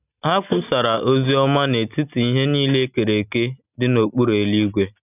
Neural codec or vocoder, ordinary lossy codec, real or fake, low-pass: none; none; real; 3.6 kHz